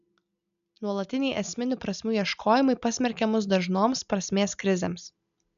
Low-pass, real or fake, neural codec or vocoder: 7.2 kHz; real; none